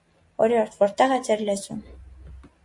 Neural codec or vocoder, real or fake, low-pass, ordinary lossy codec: none; real; 10.8 kHz; MP3, 48 kbps